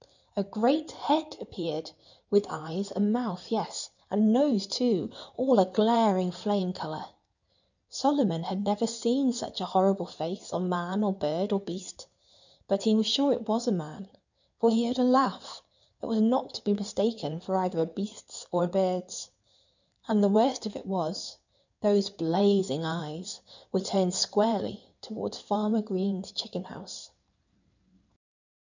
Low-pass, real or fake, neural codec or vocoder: 7.2 kHz; fake; codec, 16 kHz in and 24 kHz out, 2.2 kbps, FireRedTTS-2 codec